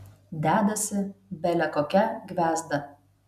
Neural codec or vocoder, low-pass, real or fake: none; 14.4 kHz; real